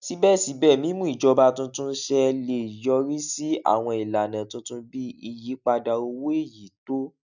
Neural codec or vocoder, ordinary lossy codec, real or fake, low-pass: none; none; real; 7.2 kHz